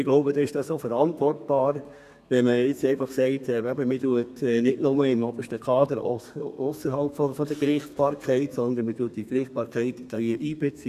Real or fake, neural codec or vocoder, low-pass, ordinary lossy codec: fake; codec, 32 kHz, 1.9 kbps, SNAC; 14.4 kHz; none